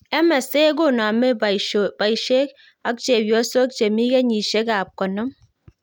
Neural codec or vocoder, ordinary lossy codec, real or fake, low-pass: none; none; real; 19.8 kHz